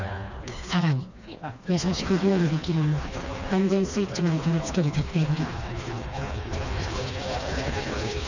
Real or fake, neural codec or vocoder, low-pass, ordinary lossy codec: fake; codec, 16 kHz, 2 kbps, FreqCodec, smaller model; 7.2 kHz; none